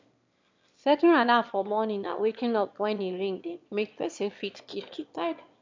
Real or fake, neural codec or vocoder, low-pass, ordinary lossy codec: fake; autoencoder, 22.05 kHz, a latent of 192 numbers a frame, VITS, trained on one speaker; 7.2 kHz; MP3, 64 kbps